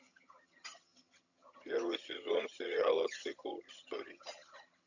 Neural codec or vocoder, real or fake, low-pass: vocoder, 22.05 kHz, 80 mel bands, HiFi-GAN; fake; 7.2 kHz